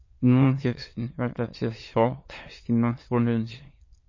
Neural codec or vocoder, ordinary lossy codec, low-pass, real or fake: autoencoder, 22.05 kHz, a latent of 192 numbers a frame, VITS, trained on many speakers; MP3, 32 kbps; 7.2 kHz; fake